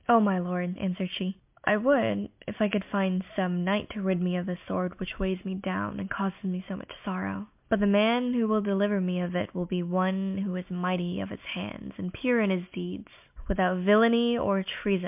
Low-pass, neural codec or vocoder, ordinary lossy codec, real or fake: 3.6 kHz; none; MP3, 32 kbps; real